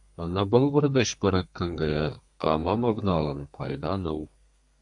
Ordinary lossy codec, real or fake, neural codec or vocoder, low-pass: AAC, 64 kbps; fake; codec, 32 kHz, 1.9 kbps, SNAC; 10.8 kHz